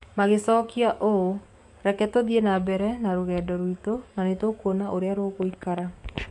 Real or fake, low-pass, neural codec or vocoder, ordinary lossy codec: fake; 10.8 kHz; codec, 44.1 kHz, 7.8 kbps, DAC; MP3, 64 kbps